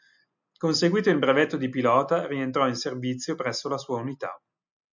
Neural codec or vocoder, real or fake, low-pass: none; real; 7.2 kHz